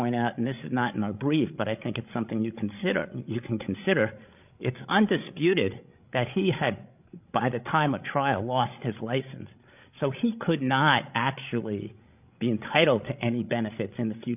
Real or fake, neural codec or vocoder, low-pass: fake; codec, 16 kHz, 16 kbps, FreqCodec, larger model; 3.6 kHz